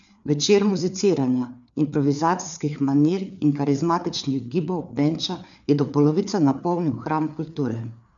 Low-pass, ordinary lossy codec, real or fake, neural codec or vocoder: 7.2 kHz; none; fake; codec, 16 kHz, 4 kbps, FreqCodec, larger model